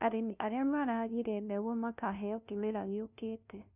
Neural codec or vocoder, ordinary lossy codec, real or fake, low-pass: codec, 16 kHz, 0.5 kbps, FunCodec, trained on LibriTTS, 25 frames a second; none; fake; 3.6 kHz